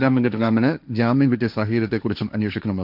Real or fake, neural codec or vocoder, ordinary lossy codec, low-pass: fake; codec, 16 kHz, 1.1 kbps, Voila-Tokenizer; none; 5.4 kHz